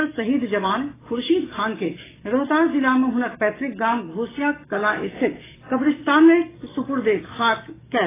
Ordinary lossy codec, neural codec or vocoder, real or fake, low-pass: AAC, 16 kbps; codec, 44.1 kHz, 7.8 kbps, DAC; fake; 3.6 kHz